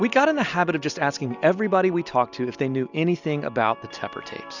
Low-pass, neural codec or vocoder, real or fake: 7.2 kHz; none; real